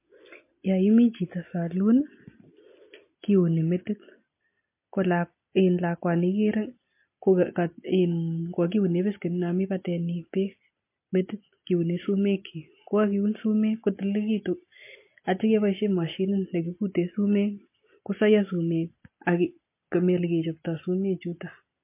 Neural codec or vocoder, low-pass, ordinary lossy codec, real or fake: none; 3.6 kHz; MP3, 24 kbps; real